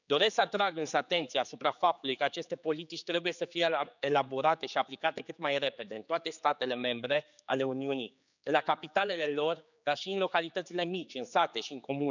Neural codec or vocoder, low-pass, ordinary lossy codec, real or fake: codec, 16 kHz, 4 kbps, X-Codec, HuBERT features, trained on general audio; 7.2 kHz; none; fake